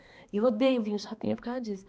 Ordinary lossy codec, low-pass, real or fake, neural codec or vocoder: none; none; fake; codec, 16 kHz, 2 kbps, X-Codec, HuBERT features, trained on balanced general audio